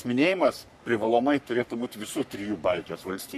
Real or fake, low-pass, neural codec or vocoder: fake; 14.4 kHz; codec, 44.1 kHz, 3.4 kbps, Pupu-Codec